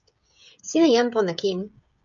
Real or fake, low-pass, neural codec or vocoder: fake; 7.2 kHz; codec, 16 kHz, 16 kbps, FreqCodec, smaller model